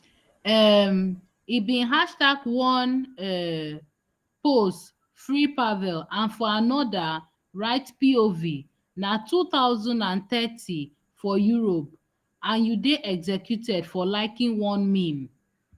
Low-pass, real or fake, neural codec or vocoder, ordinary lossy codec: 14.4 kHz; real; none; Opus, 24 kbps